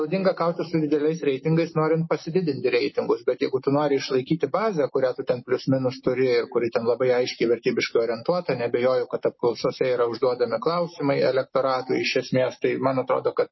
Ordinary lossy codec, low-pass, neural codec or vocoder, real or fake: MP3, 24 kbps; 7.2 kHz; none; real